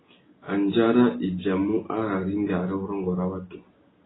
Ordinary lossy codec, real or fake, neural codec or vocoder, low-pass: AAC, 16 kbps; real; none; 7.2 kHz